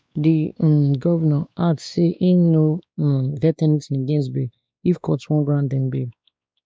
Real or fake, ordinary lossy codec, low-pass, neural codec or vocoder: fake; none; none; codec, 16 kHz, 2 kbps, X-Codec, WavLM features, trained on Multilingual LibriSpeech